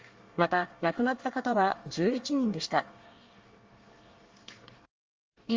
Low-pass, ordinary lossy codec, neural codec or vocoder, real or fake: 7.2 kHz; Opus, 32 kbps; codec, 24 kHz, 1 kbps, SNAC; fake